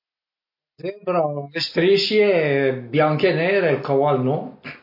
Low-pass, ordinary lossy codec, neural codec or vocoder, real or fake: 5.4 kHz; MP3, 32 kbps; none; real